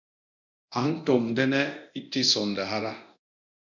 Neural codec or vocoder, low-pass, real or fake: codec, 24 kHz, 0.9 kbps, DualCodec; 7.2 kHz; fake